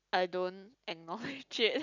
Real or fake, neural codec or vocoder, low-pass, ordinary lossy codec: real; none; 7.2 kHz; none